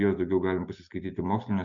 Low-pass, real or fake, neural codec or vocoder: 7.2 kHz; real; none